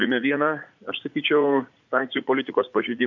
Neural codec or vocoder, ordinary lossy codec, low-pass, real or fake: vocoder, 44.1 kHz, 80 mel bands, Vocos; MP3, 64 kbps; 7.2 kHz; fake